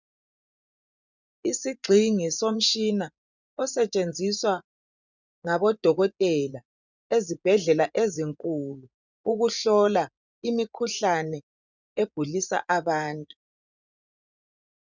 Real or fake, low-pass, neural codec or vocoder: fake; 7.2 kHz; vocoder, 24 kHz, 100 mel bands, Vocos